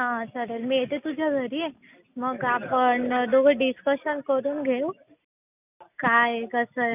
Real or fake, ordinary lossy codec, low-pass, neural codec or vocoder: real; none; 3.6 kHz; none